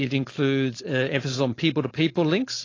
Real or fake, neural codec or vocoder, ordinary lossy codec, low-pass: fake; codec, 16 kHz, 4.8 kbps, FACodec; AAC, 32 kbps; 7.2 kHz